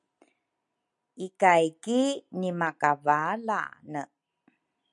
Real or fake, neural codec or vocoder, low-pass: real; none; 10.8 kHz